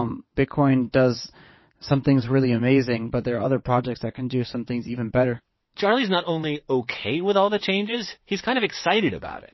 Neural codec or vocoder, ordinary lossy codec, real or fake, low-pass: vocoder, 22.05 kHz, 80 mel bands, WaveNeXt; MP3, 24 kbps; fake; 7.2 kHz